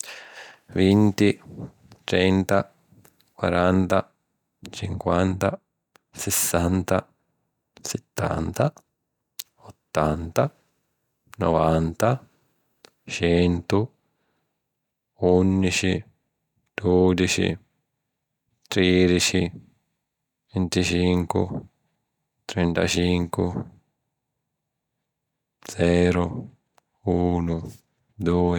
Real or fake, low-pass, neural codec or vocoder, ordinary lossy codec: real; 19.8 kHz; none; none